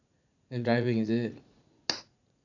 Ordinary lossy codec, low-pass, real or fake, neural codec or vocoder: none; 7.2 kHz; fake; vocoder, 44.1 kHz, 80 mel bands, Vocos